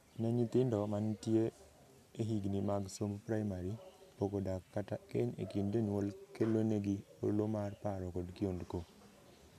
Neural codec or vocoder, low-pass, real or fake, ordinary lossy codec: none; 14.4 kHz; real; MP3, 96 kbps